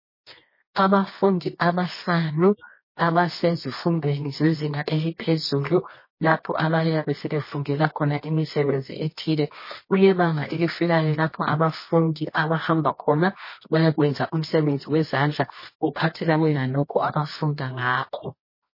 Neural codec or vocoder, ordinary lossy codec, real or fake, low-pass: codec, 24 kHz, 0.9 kbps, WavTokenizer, medium music audio release; MP3, 24 kbps; fake; 5.4 kHz